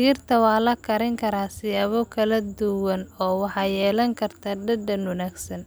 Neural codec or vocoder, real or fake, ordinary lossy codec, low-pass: none; real; none; none